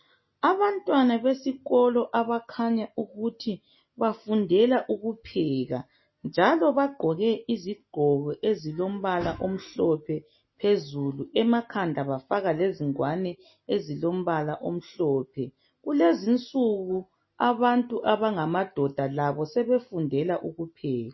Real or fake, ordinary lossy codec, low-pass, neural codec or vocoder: real; MP3, 24 kbps; 7.2 kHz; none